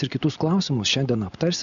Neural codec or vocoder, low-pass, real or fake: none; 7.2 kHz; real